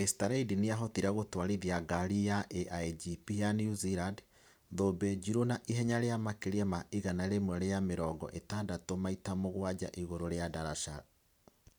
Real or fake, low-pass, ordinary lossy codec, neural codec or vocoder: fake; none; none; vocoder, 44.1 kHz, 128 mel bands every 512 samples, BigVGAN v2